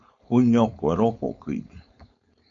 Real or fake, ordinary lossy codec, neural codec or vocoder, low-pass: fake; MP3, 64 kbps; codec, 16 kHz, 4.8 kbps, FACodec; 7.2 kHz